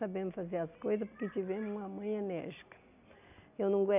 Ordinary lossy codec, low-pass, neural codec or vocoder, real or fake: none; 3.6 kHz; none; real